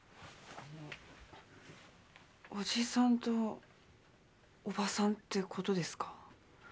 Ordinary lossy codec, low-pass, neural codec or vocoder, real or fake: none; none; none; real